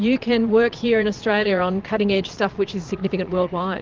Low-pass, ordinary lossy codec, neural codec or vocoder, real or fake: 7.2 kHz; Opus, 32 kbps; vocoder, 22.05 kHz, 80 mel bands, Vocos; fake